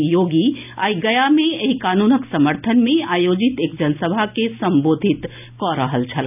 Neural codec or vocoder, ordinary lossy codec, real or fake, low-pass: none; none; real; 3.6 kHz